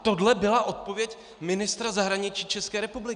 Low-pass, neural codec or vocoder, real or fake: 9.9 kHz; none; real